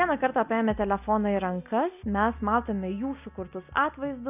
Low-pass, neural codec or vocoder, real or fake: 3.6 kHz; none; real